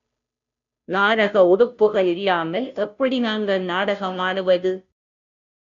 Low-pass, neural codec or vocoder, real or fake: 7.2 kHz; codec, 16 kHz, 0.5 kbps, FunCodec, trained on Chinese and English, 25 frames a second; fake